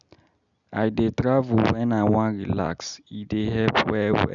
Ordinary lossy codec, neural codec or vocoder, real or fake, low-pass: none; none; real; 7.2 kHz